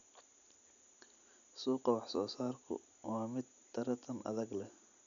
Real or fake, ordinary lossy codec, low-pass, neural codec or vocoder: real; none; 7.2 kHz; none